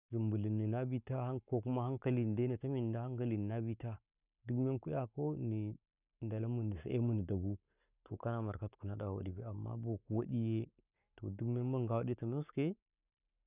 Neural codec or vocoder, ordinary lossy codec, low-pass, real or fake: codec, 44.1 kHz, 7.8 kbps, Pupu-Codec; none; 3.6 kHz; fake